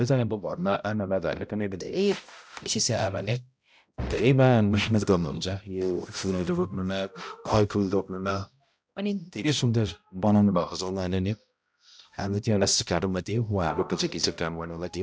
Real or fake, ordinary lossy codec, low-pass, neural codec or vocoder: fake; none; none; codec, 16 kHz, 0.5 kbps, X-Codec, HuBERT features, trained on balanced general audio